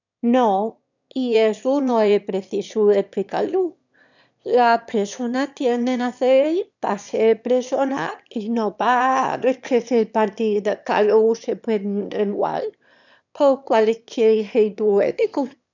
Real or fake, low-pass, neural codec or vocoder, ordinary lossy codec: fake; 7.2 kHz; autoencoder, 22.05 kHz, a latent of 192 numbers a frame, VITS, trained on one speaker; none